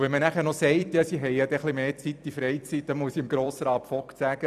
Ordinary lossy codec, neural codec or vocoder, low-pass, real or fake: none; vocoder, 44.1 kHz, 128 mel bands every 256 samples, BigVGAN v2; 14.4 kHz; fake